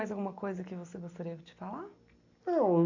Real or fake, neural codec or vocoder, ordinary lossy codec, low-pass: real; none; AAC, 48 kbps; 7.2 kHz